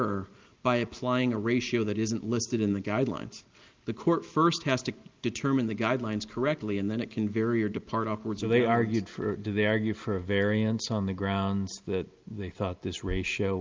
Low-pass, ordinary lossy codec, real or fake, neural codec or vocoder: 7.2 kHz; Opus, 32 kbps; real; none